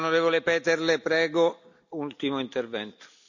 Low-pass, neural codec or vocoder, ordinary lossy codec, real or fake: 7.2 kHz; none; none; real